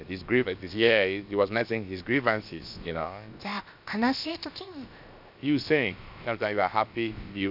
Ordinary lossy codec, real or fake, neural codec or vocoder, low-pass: none; fake; codec, 16 kHz, about 1 kbps, DyCAST, with the encoder's durations; 5.4 kHz